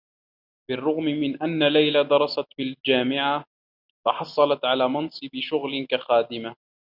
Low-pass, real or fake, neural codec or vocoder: 5.4 kHz; real; none